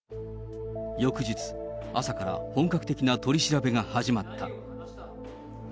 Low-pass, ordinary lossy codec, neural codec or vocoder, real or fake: none; none; none; real